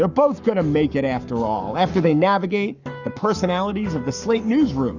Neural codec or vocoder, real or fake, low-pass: codec, 44.1 kHz, 7.8 kbps, Pupu-Codec; fake; 7.2 kHz